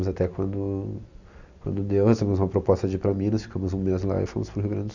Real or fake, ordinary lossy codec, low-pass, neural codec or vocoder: real; none; 7.2 kHz; none